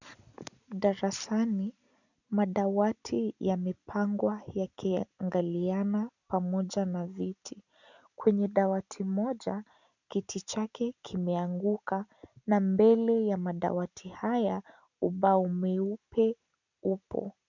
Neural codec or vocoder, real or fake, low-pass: none; real; 7.2 kHz